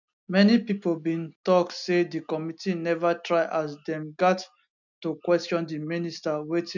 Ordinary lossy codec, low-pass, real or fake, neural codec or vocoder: none; 7.2 kHz; real; none